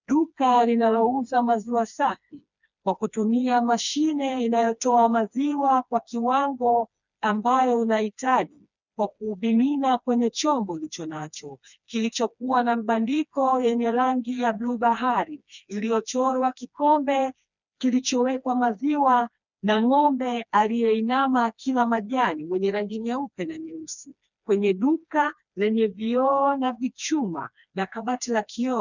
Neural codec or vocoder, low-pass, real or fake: codec, 16 kHz, 2 kbps, FreqCodec, smaller model; 7.2 kHz; fake